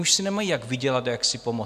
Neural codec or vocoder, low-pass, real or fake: none; 14.4 kHz; real